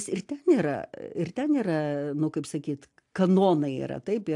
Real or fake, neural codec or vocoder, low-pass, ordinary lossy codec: real; none; 10.8 kHz; AAC, 64 kbps